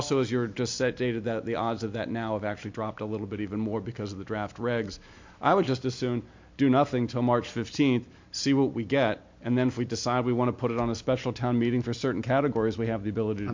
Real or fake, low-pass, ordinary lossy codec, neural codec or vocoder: real; 7.2 kHz; MP3, 48 kbps; none